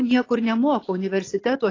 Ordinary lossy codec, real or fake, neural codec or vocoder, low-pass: AAC, 32 kbps; real; none; 7.2 kHz